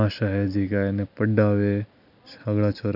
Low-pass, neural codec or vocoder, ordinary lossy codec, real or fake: 5.4 kHz; none; none; real